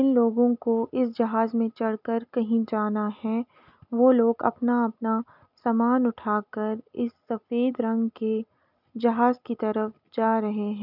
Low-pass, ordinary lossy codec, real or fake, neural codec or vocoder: 5.4 kHz; none; real; none